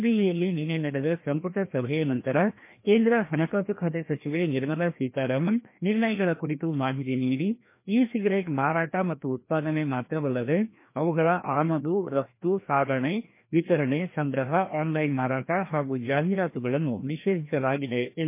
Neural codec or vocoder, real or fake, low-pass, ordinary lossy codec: codec, 16 kHz, 1 kbps, FreqCodec, larger model; fake; 3.6 kHz; MP3, 24 kbps